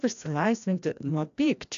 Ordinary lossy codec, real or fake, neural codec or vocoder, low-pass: AAC, 64 kbps; fake; codec, 16 kHz, 1 kbps, FreqCodec, larger model; 7.2 kHz